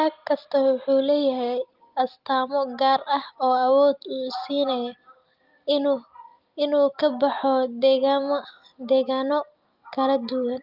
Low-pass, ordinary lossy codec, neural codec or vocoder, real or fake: 5.4 kHz; Opus, 32 kbps; none; real